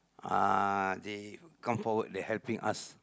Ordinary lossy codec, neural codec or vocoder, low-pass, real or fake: none; none; none; real